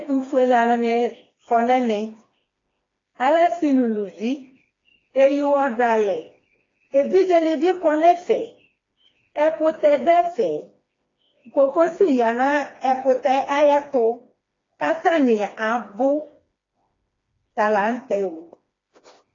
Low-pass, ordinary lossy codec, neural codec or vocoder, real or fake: 7.2 kHz; AAC, 32 kbps; codec, 16 kHz, 2 kbps, FreqCodec, smaller model; fake